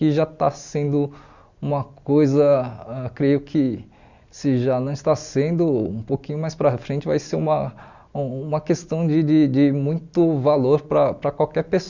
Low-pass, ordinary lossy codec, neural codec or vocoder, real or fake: 7.2 kHz; Opus, 64 kbps; none; real